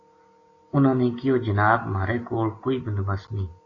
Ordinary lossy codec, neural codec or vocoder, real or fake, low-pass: AAC, 32 kbps; none; real; 7.2 kHz